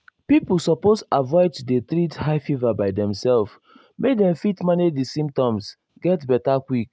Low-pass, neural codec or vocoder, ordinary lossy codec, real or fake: none; none; none; real